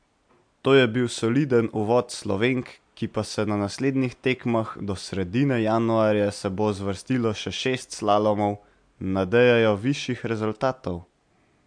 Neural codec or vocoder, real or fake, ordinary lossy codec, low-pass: none; real; MP3, 64 kbps; 9.9 kHz